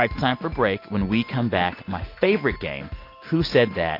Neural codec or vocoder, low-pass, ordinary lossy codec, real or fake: none; 5.4 kHz; AAC, 32 kbps; real